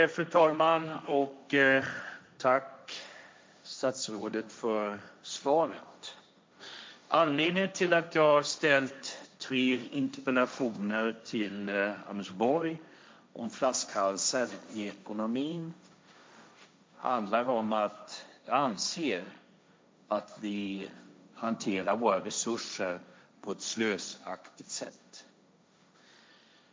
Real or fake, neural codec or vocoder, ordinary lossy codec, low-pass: fake; codec, 16 kHz, 1.1 kbps, Voila-Tokenizer; none; none